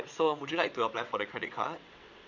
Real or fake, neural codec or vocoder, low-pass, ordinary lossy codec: fake; vocoder, 22.05 kHz, 80 mel bands, WaveNeXt; 7.2 kHz; none